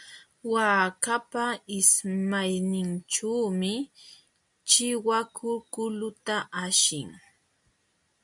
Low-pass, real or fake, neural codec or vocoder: 10.8 kHz; real; none